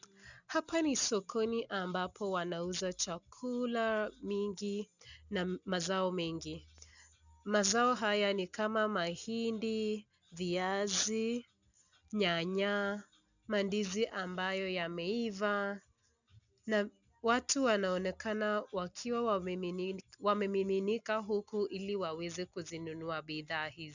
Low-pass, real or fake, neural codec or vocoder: 7.2 kHz; real; none